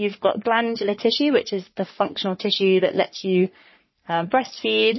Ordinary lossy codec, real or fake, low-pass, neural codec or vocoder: MP3, 24 kbps; fake; 7.2 kHz; codec, 44.1 kHz, 3.4 kbps, Pupu-Codec